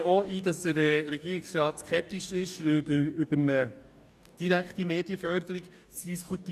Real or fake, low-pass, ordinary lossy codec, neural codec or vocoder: fake; 14.4 kHz; none; codec, 44.1 kHz, 2.6 kbps, DAC